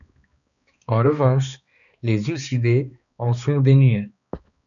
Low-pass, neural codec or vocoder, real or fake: 7.2 kHz; codec, 16 kHz, 2 kbps, X-Codec, HuBERT features, trained on balanced general audio; fake